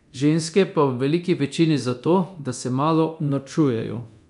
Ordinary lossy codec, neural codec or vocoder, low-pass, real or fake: none; codec, 24 kHz, 0.9 kbps, DualCodec; 10.8 kHz; fake